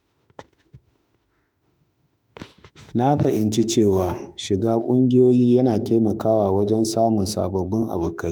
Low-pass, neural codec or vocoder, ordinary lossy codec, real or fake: none; autoencoder, 48 kHz, 32 numbers a frame, DAC-VAE, trained on Japanese speech; none; fake